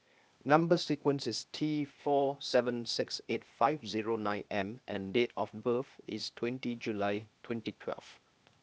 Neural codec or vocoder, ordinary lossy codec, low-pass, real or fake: codec, 16 kHz, 0.8 kbps, ZipCodec; none; none; fake